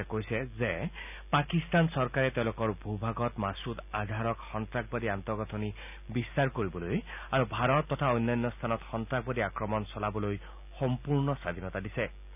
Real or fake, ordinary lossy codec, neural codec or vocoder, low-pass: real; none; none; 3.6 kHz